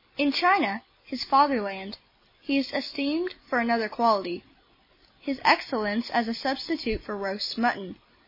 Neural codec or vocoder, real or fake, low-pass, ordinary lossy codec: none; real; 5.4 kHz; MP3, 24 kbps